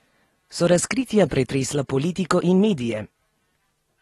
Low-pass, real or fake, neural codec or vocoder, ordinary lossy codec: 19.8 kHz; real; none; AAC, 32 kbps